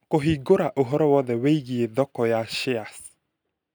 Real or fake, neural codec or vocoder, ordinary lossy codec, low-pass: real; none; none; none